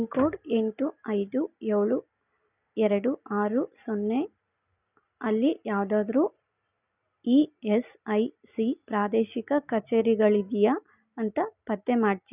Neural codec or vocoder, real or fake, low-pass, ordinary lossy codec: none; real; 3.6 kHz; none